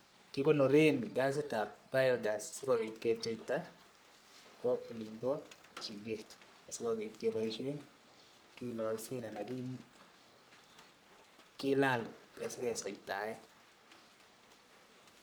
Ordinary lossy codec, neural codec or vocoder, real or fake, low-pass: none; codec, 44.1 kHz, 3.4 kbps, Pupu-Codec; fake; none